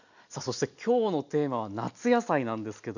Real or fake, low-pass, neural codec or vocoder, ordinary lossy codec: real; 7.2 kHz; none; none